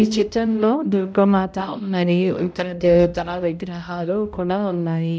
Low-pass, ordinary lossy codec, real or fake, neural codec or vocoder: none; none; fake; codec, 16 kHz, 0.5 kbps, X-Codec, HuBERT features, trained on balanced general audio